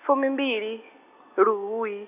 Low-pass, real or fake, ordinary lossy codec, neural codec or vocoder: 3.6 kHz; real; none; none